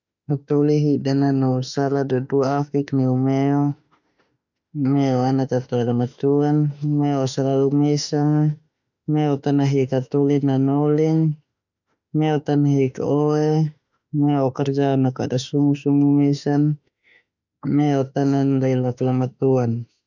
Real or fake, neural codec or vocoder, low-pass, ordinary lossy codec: fake; autoencoder, 48 kHz, 32 numbers a frame, DAC-VAE, trained on Japanese speech; 7.2 kHz; none